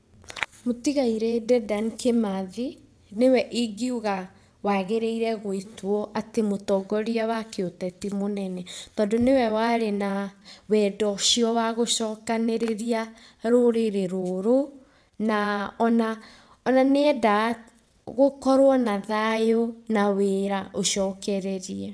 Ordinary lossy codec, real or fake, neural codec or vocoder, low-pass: none; fake; vocoder, 22.05 kHz, 80 mel bands, WaveNeXt; none